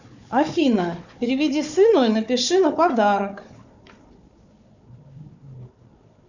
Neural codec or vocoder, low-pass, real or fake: codec, 16 kHz, 4 kbps, FunCodec, trained on Chinese and English, 50 frames a second; 7.2 kHz; fake